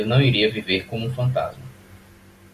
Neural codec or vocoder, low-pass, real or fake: none; 14.4 kHz; real